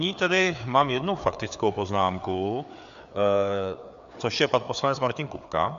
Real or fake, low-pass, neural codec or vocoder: fake; 7.2 kHz; codec, 16 kHz, 4 kbps, FreqCodec, larger model